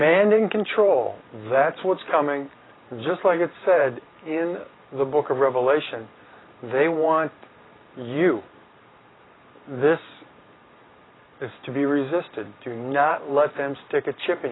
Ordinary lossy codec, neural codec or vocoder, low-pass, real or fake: AAC, 16 kbps; vocoder, 44.1 kHz, 128 mel bands every 512 samples, BigVGAN v2; 7.2 kHz; fake